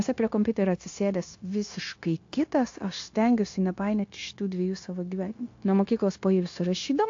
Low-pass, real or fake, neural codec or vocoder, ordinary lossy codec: 7.2 kHz; fake; codec, 16 kHz, 0.9 kbps, LongCat-Audio-Codec; AAC, 48 kbps